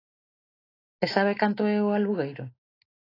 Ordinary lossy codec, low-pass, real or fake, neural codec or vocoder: AAC, 24 kbps; 5.4 kHz; real; none